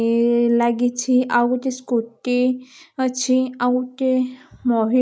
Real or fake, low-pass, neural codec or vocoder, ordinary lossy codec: real; none; none; none